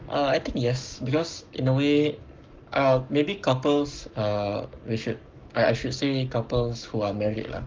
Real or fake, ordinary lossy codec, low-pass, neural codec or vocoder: fake; Opus, 24 kbps; 7.2 kHz; codec, 44.1 kHz, 7.8 kbps, Pupu-Codec